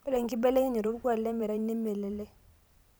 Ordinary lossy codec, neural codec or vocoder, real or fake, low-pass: none; vocoder, 44.1 kHz, 128 mel bands every 512 samples, BigVGAN v2; fake; none